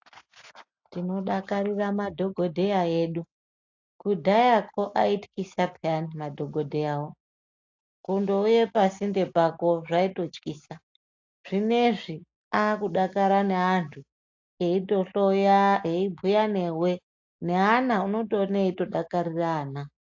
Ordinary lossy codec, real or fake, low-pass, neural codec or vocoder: AAC, 48 kbps; real; 7.2 kHz; none